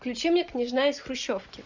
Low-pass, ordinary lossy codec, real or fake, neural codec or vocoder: 7.2 kHz; Opus, 64 kbps; real; none